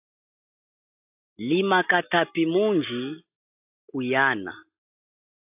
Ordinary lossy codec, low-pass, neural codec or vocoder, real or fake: AAC, 32 kbps; 3.6 kHz; none; real